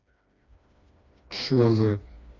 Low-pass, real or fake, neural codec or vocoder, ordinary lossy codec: 7.2 kHz; fake; codec, 16 kHz, 2 kbps, FreqCodec, smaller model; MP3, 48 kbps